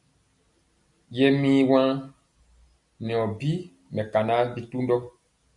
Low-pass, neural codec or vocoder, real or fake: 10.8 kHz; none; real